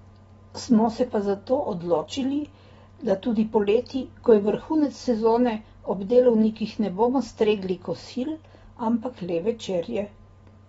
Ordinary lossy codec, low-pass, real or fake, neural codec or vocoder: AAC, 24 kbps; 19.8 kHz; real; none